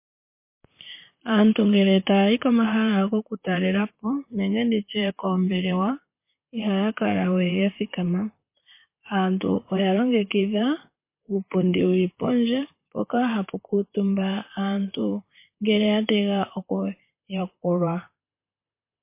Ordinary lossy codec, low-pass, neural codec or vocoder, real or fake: MP3, 24 kbps; 3.6 kHz; vocoder, 44.1 kHz, 128 mel bands, Pupu-Vocoder; fake